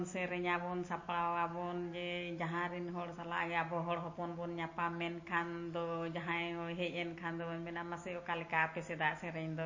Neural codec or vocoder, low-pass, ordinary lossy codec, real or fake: none; 7.2 kHz; MP3, 32 kbps; real